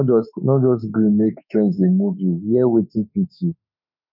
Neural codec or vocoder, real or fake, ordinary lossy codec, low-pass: autoencoder, 48 kHz, 32 numbers a frame, DAC-VAE, trained on Japanese speech; fake; none; 5.4 kHz